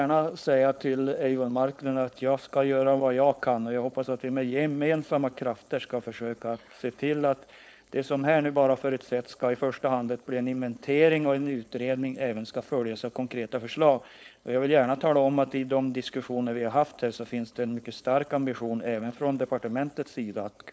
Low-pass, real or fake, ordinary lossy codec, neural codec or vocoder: none; fake; none; codec, 16 kHz, 4.8 kbps, FACodec